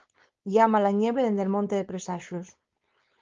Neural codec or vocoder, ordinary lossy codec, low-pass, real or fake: codec, 16 kHz, 4.8 kbps, FACodec; Opus, 32 kbps; 7.2 kHz; fake